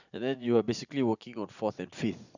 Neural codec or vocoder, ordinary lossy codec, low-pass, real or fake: vocoder, 44.1 kHz, 80 mel bands, Vocos; none; 7.2 kHz; fake